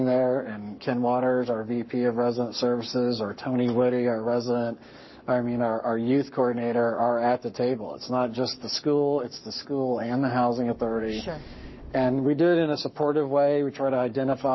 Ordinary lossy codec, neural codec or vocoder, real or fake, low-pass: MP3, 24 kbps; codec, 44.1 kHz, 7.8 kbps, Pupu-Codec; fake; 7.2 kHz